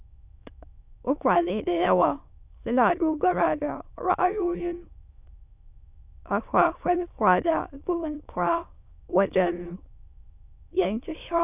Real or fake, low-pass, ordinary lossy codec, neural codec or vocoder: fake; 3.6 kHz; none; autoencoder, 22.05 kHz, a latent of 192 numbers a frame, VITS, trained on many speakers